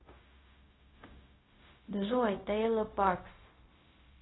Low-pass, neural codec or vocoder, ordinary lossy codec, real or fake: 7.2 kHz; codec, 16 kHz, 0.4 kbps, LongCat-Audio-Codec; AAC, 16 kbps; fake